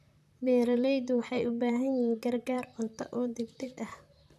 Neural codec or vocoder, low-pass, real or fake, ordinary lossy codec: vocoder, 44.1 kHz, 128 mel bands, Pupu-Vocoder; 14.4 kHz; fake; none